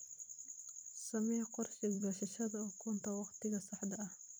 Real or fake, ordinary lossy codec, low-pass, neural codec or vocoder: fake; none; none; vocoder, 44.1 kHz, 128 mel bands every 256 samples, BigVGAN v2